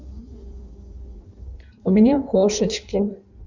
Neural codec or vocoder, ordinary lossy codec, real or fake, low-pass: codec, 16 kHz in and 24 kHz out, 1.1 kbps, FireRedTTS-2 codec; none; fake; 7.2 kHz